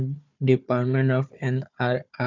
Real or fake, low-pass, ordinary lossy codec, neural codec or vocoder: fake; 7.2 kHz; none; codec, 16 kHz, 4 kbps, FunCodec, trained on Chinese and English, 50 frames a second